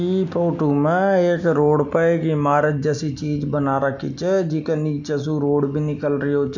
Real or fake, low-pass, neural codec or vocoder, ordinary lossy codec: real; 7.2 kHz; none; none